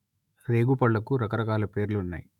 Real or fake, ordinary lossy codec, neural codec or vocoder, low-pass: fake; none; autoencoder, 48 kHz, 128 numbers a frame, DAC-VAE, trained on Japanese speech; 19.8 kHz